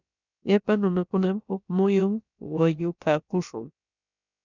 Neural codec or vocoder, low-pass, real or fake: codec, 16 kHz, about 1 kbps, DyCAST, with the encoder's durations; 7.2 kHz; fake